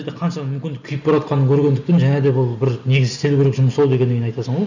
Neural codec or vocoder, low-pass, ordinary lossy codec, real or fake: none; 7.2 kHz; none; real